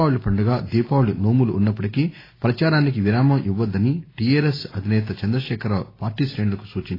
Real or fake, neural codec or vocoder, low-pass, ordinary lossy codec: real; none; 5.4 kHz; AAC, 24 kbps